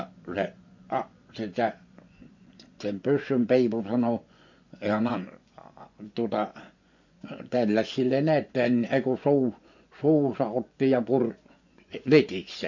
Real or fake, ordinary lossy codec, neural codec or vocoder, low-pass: fake; MP3, 48 kbps; vocoder, 24 kHz, 100 mel bands, Vocos; 7.2 kHz